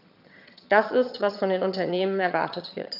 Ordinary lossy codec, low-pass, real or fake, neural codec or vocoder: none; 5.4 kHz; fake; vocoder, 22.05 kHz, 80 mel bands, HiFi-GAN